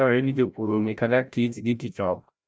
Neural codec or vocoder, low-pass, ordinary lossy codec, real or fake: codec, 16 kHz, 0.5 kbps, FreqCodec, larger model; none; none; fake